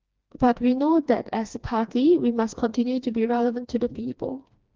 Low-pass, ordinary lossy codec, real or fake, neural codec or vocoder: 7.2 kHz; Opus, 24 kbps; fake; codec, 16 kHz, 2 kbps, FreqCodec, smaller model